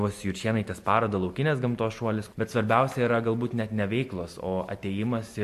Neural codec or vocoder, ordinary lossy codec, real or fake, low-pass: none; MP3, 64 kbps; real; 14.4 kHz